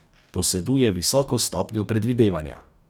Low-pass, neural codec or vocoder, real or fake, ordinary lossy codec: none; codec, 44.1 kHz, 2.6 kbps, DAC; fake; none